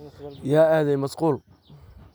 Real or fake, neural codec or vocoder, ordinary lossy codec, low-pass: real; none; none; none